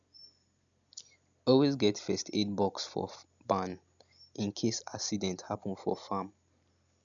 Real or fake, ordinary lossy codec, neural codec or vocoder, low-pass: real; none; none; 7.2 kHz